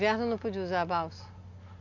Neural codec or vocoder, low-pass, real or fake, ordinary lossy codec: none; 7.2 kHz; real; none